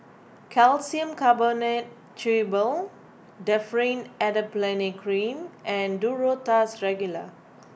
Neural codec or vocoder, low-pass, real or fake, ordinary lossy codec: none; none; real; none